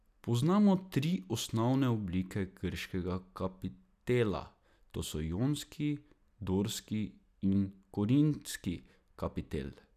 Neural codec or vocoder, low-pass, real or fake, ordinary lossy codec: none; 14.4 kHz; real; none